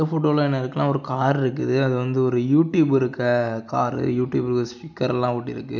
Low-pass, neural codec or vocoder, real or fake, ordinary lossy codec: 7.2 kHz; none; real; none